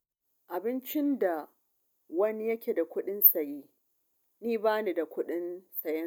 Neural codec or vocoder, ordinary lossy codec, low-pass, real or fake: none; none; 19.8 kHz; real